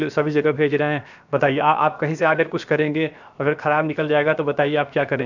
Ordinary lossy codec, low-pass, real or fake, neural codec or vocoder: none; 7.2 kHz; fake; codec, 16 kHz, 0.7 kbps, FocalCodec